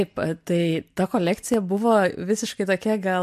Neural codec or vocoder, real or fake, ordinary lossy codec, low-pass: none; real; MP3, 64 kbps; 14.4 kHz